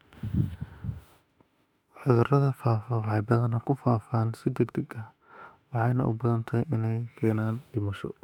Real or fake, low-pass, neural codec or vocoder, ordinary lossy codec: fake; 19.8 kHz; autoencoder, 48 kHz, 32 numbers a frame, DAC-VAE, trained on Japanese speech; none